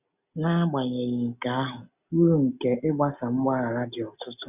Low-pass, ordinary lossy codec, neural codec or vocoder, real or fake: 3.6 kHz; none; none; real